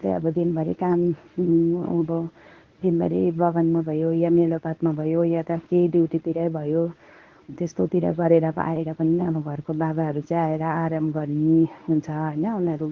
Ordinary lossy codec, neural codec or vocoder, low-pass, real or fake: Opus, 16 kbps; codec, 24 kHz, 0.9 kbps, WavTokenizer, medium speech release version 1; 7.2 kHz; fake